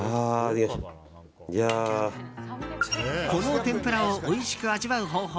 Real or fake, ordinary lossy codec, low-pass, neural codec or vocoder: real; none; none; none